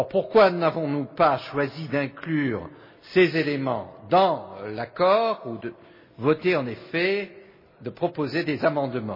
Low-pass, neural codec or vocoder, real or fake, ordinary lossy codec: 5.4 kHz; none; real; MP3, 24 kbps